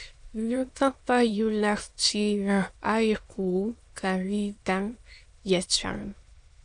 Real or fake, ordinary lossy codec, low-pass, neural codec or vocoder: fake; AAC, 64 kbps; 9.9 kHz; autoencoder, 22.05 kHz, a latent of 192 numbers a frame, VITS, trained on many speakers